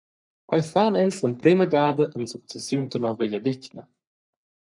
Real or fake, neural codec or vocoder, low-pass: fake; codec, 44.1 kHz, 3.4 kbps, Pupu-Codec; 10.8 kHz